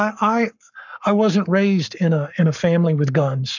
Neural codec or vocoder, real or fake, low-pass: codec, 44.1 kHz, 7.8 kbps, Pupu-Codec; fake; 7.2 kHz